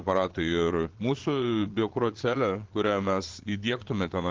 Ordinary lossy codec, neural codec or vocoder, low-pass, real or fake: Opus, 16 kbps; vocoder, 22.05 kHz, 80 mel bands, Vocos; 7.2 kHz; fake